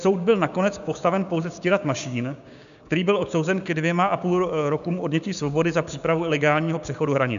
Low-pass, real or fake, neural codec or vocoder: 7.2 kHz; fake; codec, 16 kHz, 6 kbps, DAC